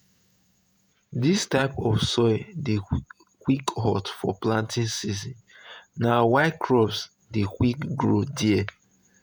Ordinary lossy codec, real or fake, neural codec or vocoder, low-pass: none; fake; vocoder, 48 kHz, 128 mel bands, Vocos; none